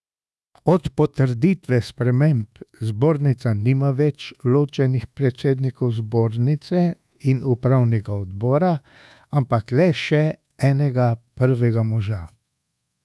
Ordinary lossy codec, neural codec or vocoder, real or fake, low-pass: none; codec, 24 kHz, 1.2 kbps, DualCodec; fake; none